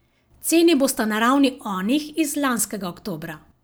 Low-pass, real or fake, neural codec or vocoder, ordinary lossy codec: none; real; none; none